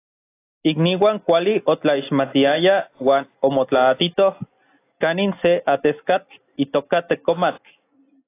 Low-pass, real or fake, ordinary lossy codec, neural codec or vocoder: 3.6 kHz; real; AAC, 24 kbps; none